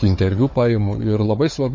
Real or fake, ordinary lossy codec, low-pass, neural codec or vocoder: fake; MP3, 32 kbps; 7.2 kHz; codec, 16 kHz, 4 kbps, FunCodec, trained on Chinese and English, 50 frames a second